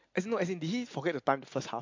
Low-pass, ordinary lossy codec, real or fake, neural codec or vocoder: 7.2 kHz; MP3, 48 kbps; real; none